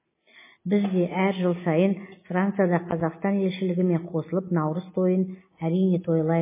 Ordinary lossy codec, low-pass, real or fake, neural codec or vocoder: MP3, 16 kbps; 3.6 kHz; real; none